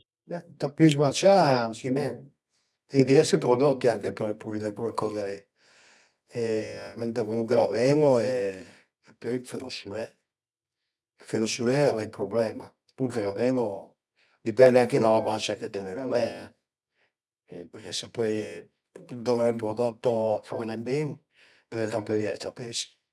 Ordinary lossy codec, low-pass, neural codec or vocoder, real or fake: none; none; codec, 24 kHz, 0.9 kbps, WavTokenizer, medium music audio release; fake